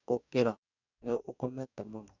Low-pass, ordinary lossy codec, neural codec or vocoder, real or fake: 7.2 kHz; none; codec, 44.1 kHz, 2.6 kbps, DAC; fake